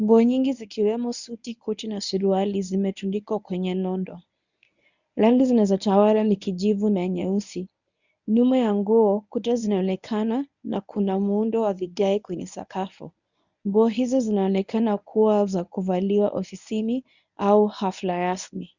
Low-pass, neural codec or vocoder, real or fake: 7.2 kHz; codec, 24 kHz, 0.9 kbps, WavTokenizer, medium speech release version 1; fake